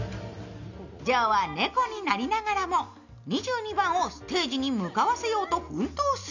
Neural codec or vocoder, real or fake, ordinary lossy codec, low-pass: none; real; none; 7.2 kHz